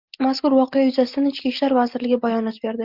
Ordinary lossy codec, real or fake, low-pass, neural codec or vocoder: Opus, 24 kbps; real; 5.4 kHz; none